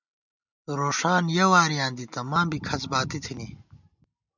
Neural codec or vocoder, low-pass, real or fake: none; 7.2 kHz; real